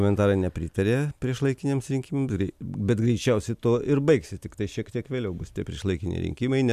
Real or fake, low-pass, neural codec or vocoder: real; 14.4 kHz; none